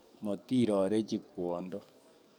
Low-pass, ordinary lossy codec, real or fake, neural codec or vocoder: none; none; fake; codec, 44.1 kHz, 7.8 kbps, DAC